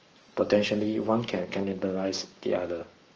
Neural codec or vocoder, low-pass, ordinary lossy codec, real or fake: codec, 44.1 kHz, 7.8 kbps, Pupu-Codec; 7.2 kHz; Opus, 24 kbps; fake